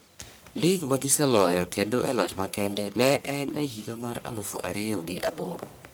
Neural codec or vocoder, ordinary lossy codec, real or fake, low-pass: codec, 44.1 kHz, 1.7 kbps, Pupu-Codec; none; fake; none